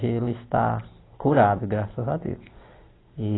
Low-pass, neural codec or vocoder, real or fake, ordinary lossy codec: 7.2 kHz; none; real; AAC, 16 kbps